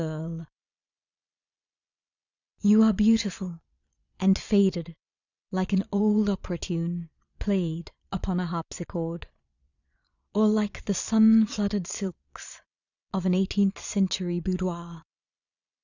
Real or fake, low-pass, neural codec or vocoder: fake; 7.2 kHz; vocoder, 22.05 kHz, 80 mel bands, Vocos